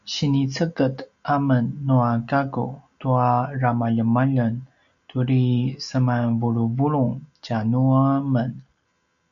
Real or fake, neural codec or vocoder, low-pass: real; none; 7.2 kHz